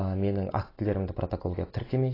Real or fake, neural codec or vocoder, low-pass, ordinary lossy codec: real; none; 5.4 kHz; AAC, 24 kbps